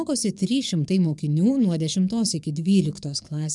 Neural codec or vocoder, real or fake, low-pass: codec, 44.1 kHz, 7.8 kbps, DAC; fake; 10.8 kHz